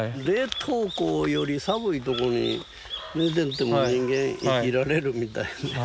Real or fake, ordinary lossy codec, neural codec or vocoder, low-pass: real; none; none; none